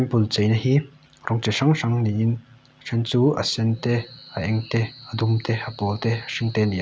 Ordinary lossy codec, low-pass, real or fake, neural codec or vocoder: none; none; real; none